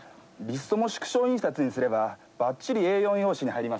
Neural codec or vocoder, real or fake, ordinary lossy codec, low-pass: none; real; none; none